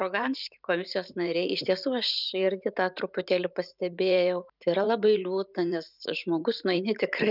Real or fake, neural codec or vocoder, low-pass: fake; vocoder, 44.1 kHz, 128 mel bands every 512 samples, BigVGAN v2; 5.4 kHz